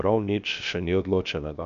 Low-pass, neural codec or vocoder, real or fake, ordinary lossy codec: 7.2 kHz; codec, 16 kHz, 0.7 kbps, FocalCodec; fake; none